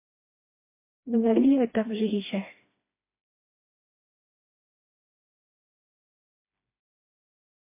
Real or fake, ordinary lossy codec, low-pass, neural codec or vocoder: fake; MP3, 24 kbps; 3.6 kHz; codec, 16 kHz, 2 kbps, FreqCodec, smaller model